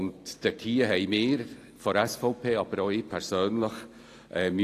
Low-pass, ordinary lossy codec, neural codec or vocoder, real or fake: 14.4 kHz; AAC, 48 kbps; none; real